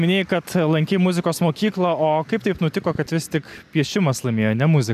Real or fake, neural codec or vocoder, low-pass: real; none; 14.4 kHz